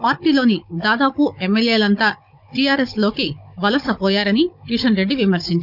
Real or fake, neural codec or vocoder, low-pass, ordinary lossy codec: fake; codec, 16 kHz, 16 kbps, FunCodec, trained on Chinese and English, 50 frames a second; 5.4 kHz; none